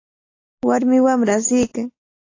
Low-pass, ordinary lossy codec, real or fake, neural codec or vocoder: 7.2 kHz; AAC, 32 kbps; real; none